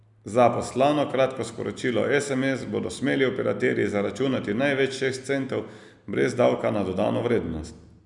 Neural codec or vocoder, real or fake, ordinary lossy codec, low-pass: none; real; none; 10.8 kHz